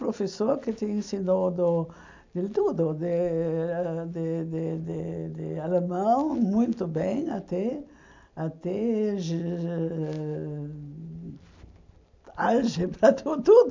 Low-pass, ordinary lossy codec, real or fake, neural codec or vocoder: 7.2 kHz; MP3, 64 kbps; real; none